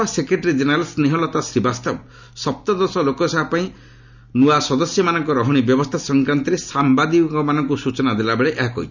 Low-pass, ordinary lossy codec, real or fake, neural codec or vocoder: 7.2 kHz; none; real; none